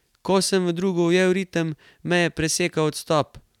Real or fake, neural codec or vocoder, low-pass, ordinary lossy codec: real; none; 19.8 kHz; none